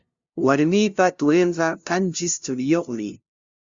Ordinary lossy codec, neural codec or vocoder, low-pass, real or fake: Opus, 64 kbps; codec, 16 kHz, 0.5 kbps, FunCodec, trained on LibriTTS, 25 frames a second; 7.2 kHz; fake